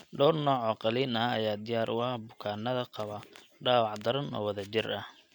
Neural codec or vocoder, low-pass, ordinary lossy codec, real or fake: none; 19.8 kHz; none; real